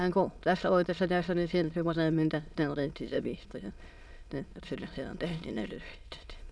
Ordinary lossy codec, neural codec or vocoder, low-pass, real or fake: none; autoencoder, 22.05 kHz, a latent of 192 numbers a frame, VITS, trained on many speakers; none; fake